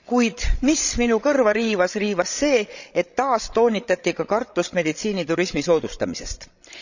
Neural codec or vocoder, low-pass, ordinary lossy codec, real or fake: codec, 16 kHz, 16 kbps, FreqCodec, larger model; 7.2 kHz; none; fake